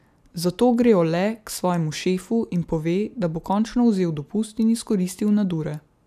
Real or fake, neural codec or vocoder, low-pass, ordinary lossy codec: real; none; 14.4 kHz; AAC, 96 kbps